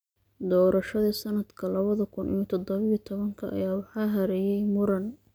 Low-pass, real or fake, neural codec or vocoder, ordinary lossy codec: none; real; none; none